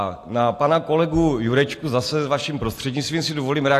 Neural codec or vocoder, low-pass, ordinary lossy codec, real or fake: none; 14.4 kHz; AAC, 64 kbps; real